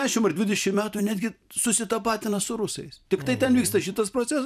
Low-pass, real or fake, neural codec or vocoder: 14.4 kHz; fake; vocoder, 44.1 kHz, 128 mel bands every 256 samples, BigVGAN v2